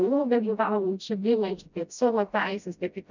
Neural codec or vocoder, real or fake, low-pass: codec, 16 kHz, 0.5 kbps, FreqCodec, smaller model; fake; 7.2 kHz